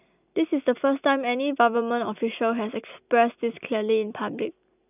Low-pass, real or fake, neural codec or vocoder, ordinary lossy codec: 3.6 kHz; real; none; none